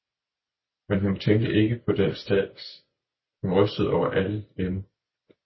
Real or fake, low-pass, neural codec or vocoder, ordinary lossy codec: real; 7.2 kHz; none; MP3, 24 kbps